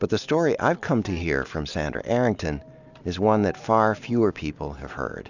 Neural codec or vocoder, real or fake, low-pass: none; real; 7.2 kHz